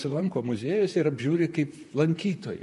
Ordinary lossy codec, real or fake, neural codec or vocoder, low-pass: MP3, 48 kbps; fake; vocoder, 44.1 kHz, 128 mel bands, Pupu-Vocoder; 14.4 kHz